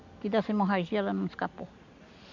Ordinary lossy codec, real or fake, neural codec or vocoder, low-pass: MP3, 64 kbps; real; none; 7.2 kHz